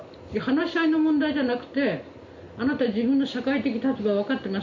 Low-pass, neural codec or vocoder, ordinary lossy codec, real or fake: 7.2 kHz; none; MP3, 48 kbps; real